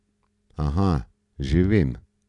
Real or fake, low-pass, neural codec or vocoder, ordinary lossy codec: real; 10.8 kHz; none; MP3, 96 kbps